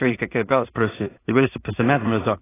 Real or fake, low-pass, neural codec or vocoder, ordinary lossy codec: fake; 3.6 kHz; codec, 16 kHz in and 24 kHz out, 0.4 kbps, LongCat-Audio-Codec, two codebook decoder; AAC, 16 kbps